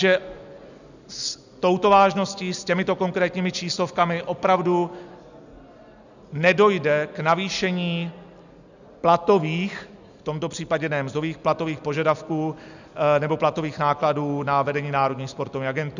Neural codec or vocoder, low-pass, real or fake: none; 7.2 kHz; real